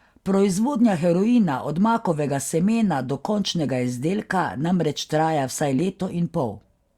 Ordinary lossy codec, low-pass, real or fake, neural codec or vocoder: Opus, 64 kbps; 19.8 kHz; real; none